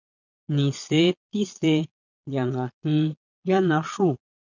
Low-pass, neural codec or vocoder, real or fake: 7.2 kHz; vocoder, 22.05 kHz, 80 mel bands, Vocos; fake